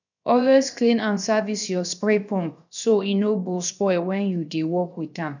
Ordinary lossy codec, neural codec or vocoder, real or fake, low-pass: none; codec, 16 kHz, 0.7 kbps, FocalCodec; fake; 7.2 kHz